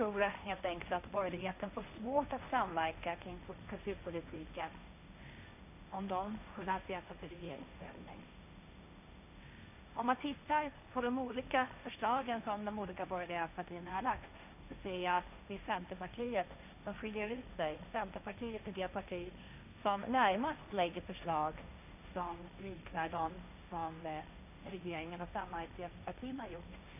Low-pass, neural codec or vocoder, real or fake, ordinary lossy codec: 3.6 kHz; codec, 16 kHz, 1.1 kbps, Voila-Tokenizer; fake; none